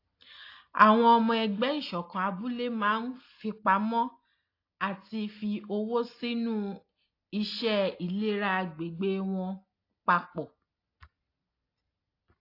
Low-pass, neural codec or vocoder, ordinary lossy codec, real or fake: 5.4 kHz; none; AAC, 32 kbps; real